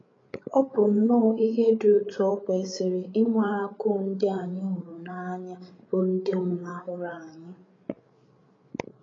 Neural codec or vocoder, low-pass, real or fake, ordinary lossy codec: codec, 16 kHz, 8 kbps, FreqCodec, larger model; 7.2 kHz; fake; AAC, 32 kbps